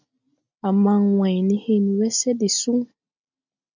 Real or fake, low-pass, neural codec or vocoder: real; 7.2 kHz; none